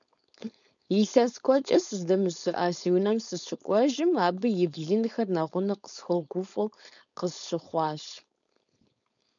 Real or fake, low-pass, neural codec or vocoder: fake; 7.2 kHz; codec, 16 kHz, 4.8 kbps, FACodec